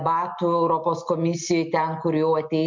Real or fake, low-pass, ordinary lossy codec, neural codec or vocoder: real; 7.2 kHz; MP3, 64 kbps; none